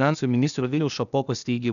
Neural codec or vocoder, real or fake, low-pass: codec, 16 kHz, 0.8 kbps, ZipCodec; fake; 7.2 kHz